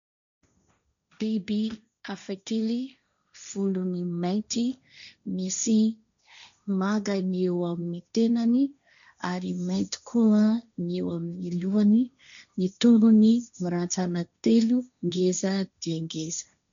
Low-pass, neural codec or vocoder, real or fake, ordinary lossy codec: 7.2 kHz; codec, 16 kHz, 1.1 kbps, Voila-Tokenizer; fake; MP3, 96 kbps